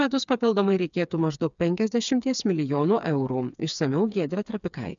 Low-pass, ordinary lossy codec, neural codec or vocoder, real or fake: 7.2 kHz; MP3, 96 kbps; codec, 16 kHz, 4 kbps, FreqCodec, smaller model; fake